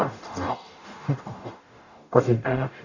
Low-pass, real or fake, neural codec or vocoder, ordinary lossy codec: 7.2 kHz; fake; codec, 44.1 kHz, 0.9 kbps, DAC; none